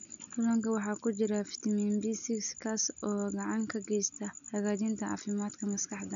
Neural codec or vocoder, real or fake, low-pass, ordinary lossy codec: none; real; 7.2 kHz; none